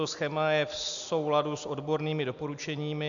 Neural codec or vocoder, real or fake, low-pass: none; real; 7.2 kHz